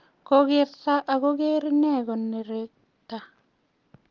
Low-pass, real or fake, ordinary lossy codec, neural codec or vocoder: 7.2 kHz; real; Opus, 24 kbps; none